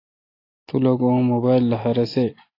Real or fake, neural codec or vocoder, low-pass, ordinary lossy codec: real; none; 5.4 kHz; AAC, 24 kbps